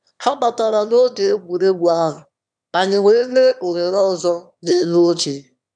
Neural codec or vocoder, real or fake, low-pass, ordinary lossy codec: autoencoder, 22.05 kHz, a latent of 192 numbers a frame, VITS, trained on one speaker; fake; 9.9 kHz; none